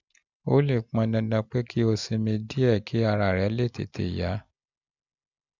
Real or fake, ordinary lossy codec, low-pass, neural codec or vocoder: real; none; 7.2 kHz; none